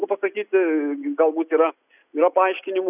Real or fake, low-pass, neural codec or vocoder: real; 3.6 kHz; none